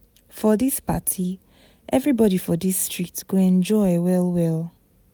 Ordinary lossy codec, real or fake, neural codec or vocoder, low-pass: none; real; none; none